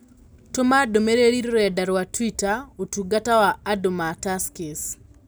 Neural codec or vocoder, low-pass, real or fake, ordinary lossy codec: none; none; real; none